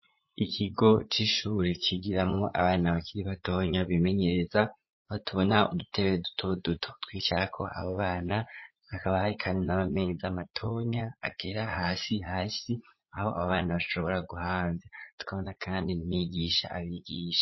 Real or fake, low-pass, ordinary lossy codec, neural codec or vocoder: fake; 7.2 kHz; MP3, 24 kbps; vocoder, 44.1 kHz, 80 mel bands, Vocos